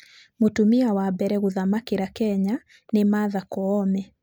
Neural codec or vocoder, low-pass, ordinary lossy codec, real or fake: none; none; none; real